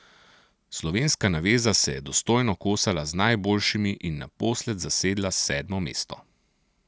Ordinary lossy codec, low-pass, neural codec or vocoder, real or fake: none; none; none; real